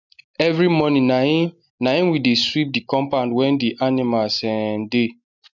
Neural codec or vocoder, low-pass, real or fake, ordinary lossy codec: none; 7.2 kHz; real; none